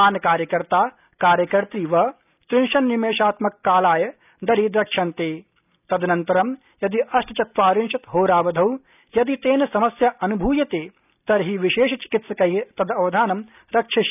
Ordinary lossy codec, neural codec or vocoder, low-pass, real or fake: none; none; 3.6 kHz; real